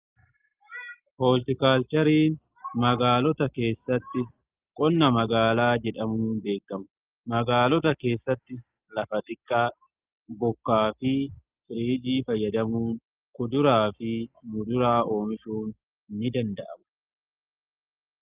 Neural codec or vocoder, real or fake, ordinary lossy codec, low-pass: none; real; Opus, 24 kbps; 3.6 kHz